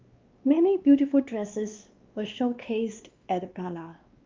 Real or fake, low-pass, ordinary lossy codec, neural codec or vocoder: fake; 7.2 kHz; Opus, 24 kbps; codec, 16 kHz, 2 kbps, X-Codec, WavLM features, trained on Multilingual LibriSpeech